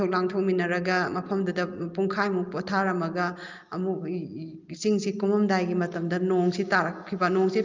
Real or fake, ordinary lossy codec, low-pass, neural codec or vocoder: real; Opus, 24 kbps; 7.2 kHz; none